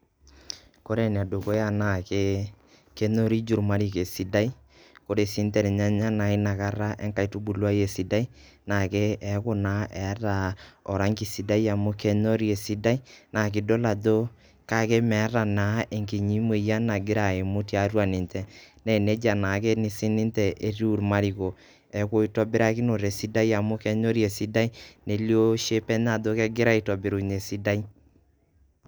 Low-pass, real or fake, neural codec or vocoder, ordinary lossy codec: none; real; none; none